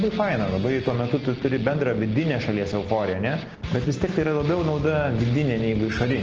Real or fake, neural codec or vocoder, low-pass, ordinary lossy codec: real; none; 7.2 kHz; Opus, 16 kbps